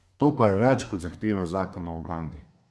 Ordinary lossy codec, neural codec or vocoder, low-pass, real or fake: none; codec, 24 kHz, 1 kbps, SNAC; none; fake